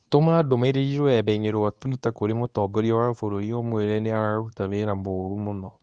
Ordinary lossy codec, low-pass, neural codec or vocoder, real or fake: none; 9.9 kHz; codec, 24 kHz, 0.9 kbps, WavTokenizer, medium speech release version 2; fake